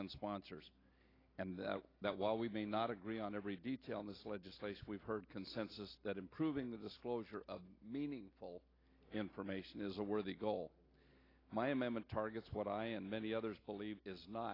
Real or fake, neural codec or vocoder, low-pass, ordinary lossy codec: real; none; 5.4 kHz; AAC, 24 kbps